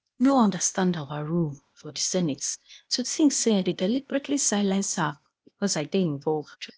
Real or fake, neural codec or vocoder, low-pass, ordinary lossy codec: fake; codec, 16 kHz, 0.8 kbps, ZipCodec; none; none